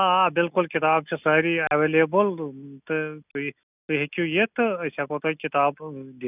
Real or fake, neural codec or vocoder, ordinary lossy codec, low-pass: real; none; none; 3.6 kHz